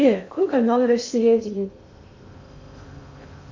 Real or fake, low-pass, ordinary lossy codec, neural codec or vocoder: fake; 7.2 kHz; MP3, 48 kbps; codec, 16 kHz in and 24 kHz out, 0.6 kbps, FocalCodec, streaming, 4096 codes